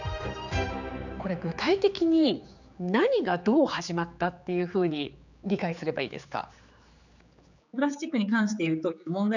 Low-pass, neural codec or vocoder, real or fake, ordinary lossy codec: 7.2 kHz; codec, 16 kHz, 4 kbps, X-Codec, HuBERT features, trained on general audio; fake; none